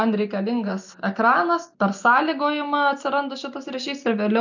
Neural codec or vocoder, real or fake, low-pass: none; real; 7.2 kHz